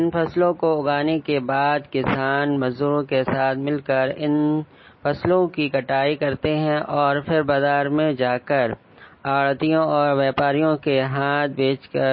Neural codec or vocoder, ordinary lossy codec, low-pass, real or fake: none; MP3, 24 kbps; 7.2 kHz; real